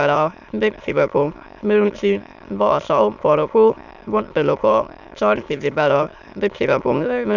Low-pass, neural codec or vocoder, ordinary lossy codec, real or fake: 7.2 kHz; autoencoder, 22.05 kHz, a latent of 192 numbers a frame, VITS, trained on many speakers; none; fake